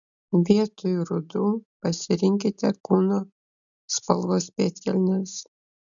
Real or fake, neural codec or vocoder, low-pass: real; none; 7.2 kHz